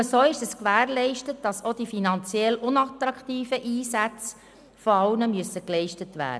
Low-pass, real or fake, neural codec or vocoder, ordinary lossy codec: none; real; none; none